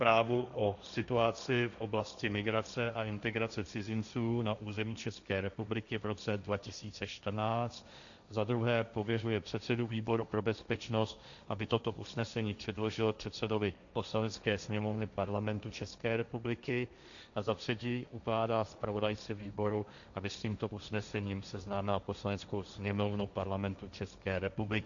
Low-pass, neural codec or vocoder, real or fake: 7.2 kHz; codec, 16 kHz, 1.1 kbps, Voila-Tokenizer; fake